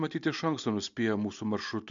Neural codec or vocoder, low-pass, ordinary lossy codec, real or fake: none; 7.2 kHz; MP3, 64 kbps; real